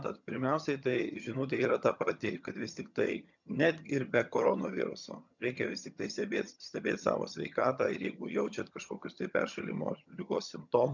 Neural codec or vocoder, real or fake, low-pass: vocoder, 22.05 kHz, 80 mel bands, HiFi-GAN; fake; 7.2 kHz